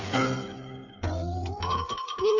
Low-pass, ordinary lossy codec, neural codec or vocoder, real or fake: 7.2 kHz; none; codec, 16 kHz, 8 kbps, FreqCodec, smaller model; fake